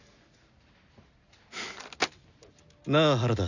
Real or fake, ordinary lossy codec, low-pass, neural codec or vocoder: real; none; 7.2 kHz; none